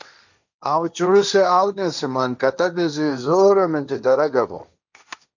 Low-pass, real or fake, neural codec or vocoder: 7.2 kHz; fake; codec, 16 kHz, 1.1 kbps, Voila-Tokenizer